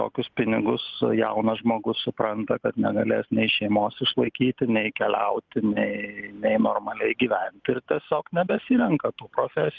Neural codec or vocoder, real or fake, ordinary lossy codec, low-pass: none; real; Opus, 32 kbps; 7.2 kHz